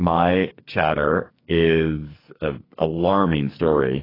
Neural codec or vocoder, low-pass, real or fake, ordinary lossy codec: codec, 44.1 kHz, 2.6 kbps, SNAC; 5.4 kHz; fake; AAC, 24 kbps